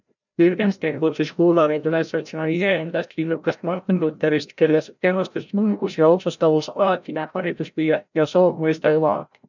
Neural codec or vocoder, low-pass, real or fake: codec, 16 kHz, 0.5 kbps, FreqCodec, larger model; 7.2 kHz; fake